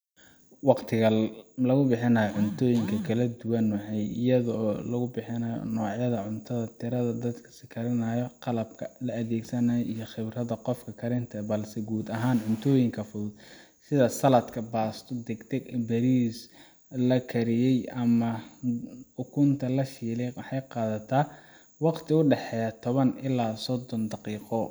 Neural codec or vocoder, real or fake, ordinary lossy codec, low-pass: none; real; none; none